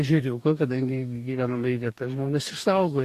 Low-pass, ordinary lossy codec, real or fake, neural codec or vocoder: 14.4 kHz; AAC, 64 kbps; fake; codec, 44.1 kHz, 2.6 kbps, DAC